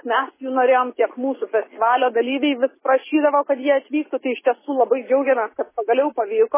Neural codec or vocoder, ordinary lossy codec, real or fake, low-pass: none; MP3, 16 kbps; real; 3.6 kHz